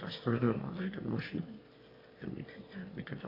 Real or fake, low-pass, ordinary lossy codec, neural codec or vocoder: fake; 5.4 kHz; AAC, 24 kbps; autoencoder, 22.05 kHz, a latent of 192 numbers a frame, VITS, trained on one speaker